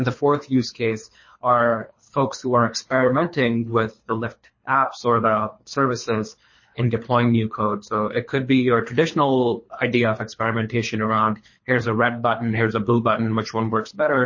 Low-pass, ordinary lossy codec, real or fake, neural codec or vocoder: 7.2 kHz; MP3, 32 kbps; fake; codec, 24 kHz, 3 kbps, HILCodec